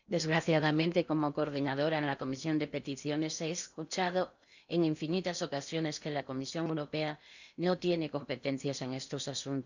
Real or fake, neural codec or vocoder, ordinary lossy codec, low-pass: fake; codec, 16 kHz in and 24 kHz out, 0.8 kbps, FocalCodec, streaming, 65536 codes; none; 7.2 kHz